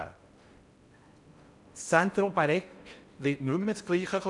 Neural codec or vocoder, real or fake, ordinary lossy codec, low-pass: codec, 16 kHz in and 24 kHz out, 0.8 kbps, FocalCodec, streaming, 65536 codes; fake; none; 10.8 kHz